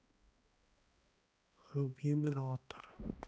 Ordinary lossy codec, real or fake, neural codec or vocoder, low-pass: none; fake; codec, 16 kHz, 1 kbps, X-Codec, HuBERT features, trained on balanced general audio; none